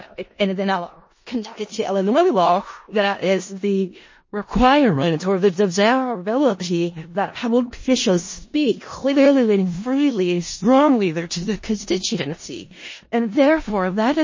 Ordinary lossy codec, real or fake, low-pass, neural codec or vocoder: MP3, 32 kbps; fake; 7.2 kHz; codec, 16 kHz in and 24 kHz out, 0.4 kbps, LongCat-Audio-Codec, four codebook decoder